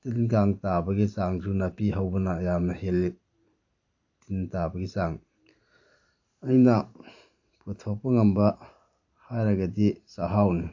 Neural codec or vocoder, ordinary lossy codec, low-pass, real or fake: vocoder, 44.1 kHz, 128 mel bands every 512 samples, BigVGAN v2; AAC, 48 kbps; 7.2 kHz; fake